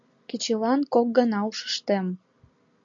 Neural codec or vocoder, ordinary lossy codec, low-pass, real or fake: none; MP3, 64 kbps; 7.2 kHz; real